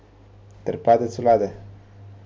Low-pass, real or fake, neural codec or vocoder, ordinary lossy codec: none; real; none; none